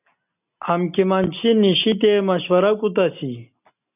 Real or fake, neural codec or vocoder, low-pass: real; none; 3.6 kHz